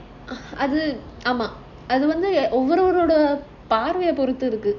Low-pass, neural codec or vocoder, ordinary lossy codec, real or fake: 7.2 kHz; none; none; real